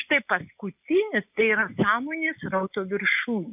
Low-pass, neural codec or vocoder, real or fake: 3.6 kHz; none; real